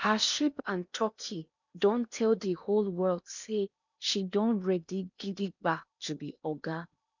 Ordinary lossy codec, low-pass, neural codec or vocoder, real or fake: none; 7.2 kHz; codec, 16 kHz in and 24 kHz out, 0.8 kbps, FocalCodec, streaming, 65536 codes; fake